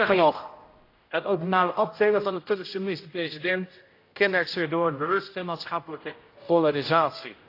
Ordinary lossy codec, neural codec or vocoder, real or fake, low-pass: AAC, 32 kbps; codec, 16 kHz, 0.5 kbps, X-Codec, HuBERT features, trained on general audio; fake; 5.4 kHz